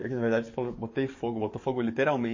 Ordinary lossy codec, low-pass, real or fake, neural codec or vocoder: MP3, 32 kbps; 7.2 kHz; fake; codec, 44.1 kHz, 7.8 kbps, DAC